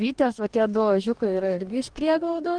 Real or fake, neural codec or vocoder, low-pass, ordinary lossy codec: fake; codec, 44.1 kHz, 2.6 kbps, DAC; 9.9 kHz; Opus, 24 kbps